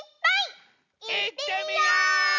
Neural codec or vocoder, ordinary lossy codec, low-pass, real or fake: none; none; 7.2 kHz; real